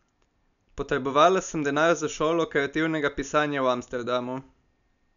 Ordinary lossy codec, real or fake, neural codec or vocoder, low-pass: none; real; none; 7.2 kHz